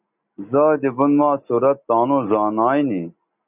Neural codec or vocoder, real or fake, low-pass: none; real; 3.6 kHz